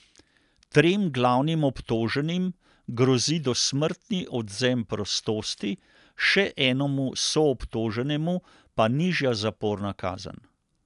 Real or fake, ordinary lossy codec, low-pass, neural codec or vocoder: real; none; 10.8 kHz; none